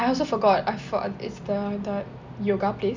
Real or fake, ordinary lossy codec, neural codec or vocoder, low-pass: real; MP3, 64 kbps; none; 7.2 kHz